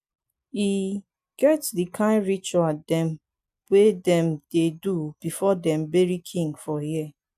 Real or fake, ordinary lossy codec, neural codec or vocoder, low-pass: real; AAC, 96 kbps; none; 14.4 kHz